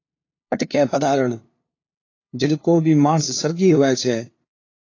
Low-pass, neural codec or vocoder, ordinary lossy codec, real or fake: 7.2 kHz; codec, 16 kHz, 2 kbps, FunCodec, trained on LibriTTS, 25 frames a second; AAC, 32 kbps; fake